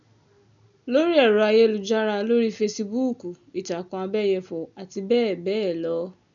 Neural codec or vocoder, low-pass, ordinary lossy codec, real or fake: none; 7.2 kHz; none; real